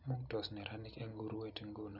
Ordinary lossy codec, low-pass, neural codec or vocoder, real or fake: none; 5.4 kHz; none; real